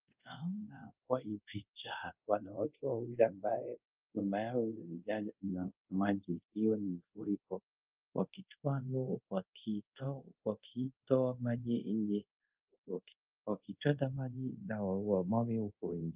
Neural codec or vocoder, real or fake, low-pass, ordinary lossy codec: codec, 24 kHz, 0.5 kbps, DualCodec; fake; 3.6 kHz; Opus, 24 kbps